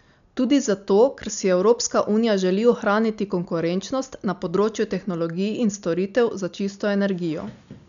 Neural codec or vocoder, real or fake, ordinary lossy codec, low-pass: none; real; none; 7.2 kHz